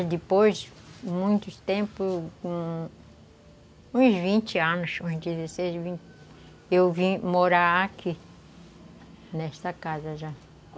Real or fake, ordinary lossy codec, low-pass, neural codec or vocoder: real; none; none; none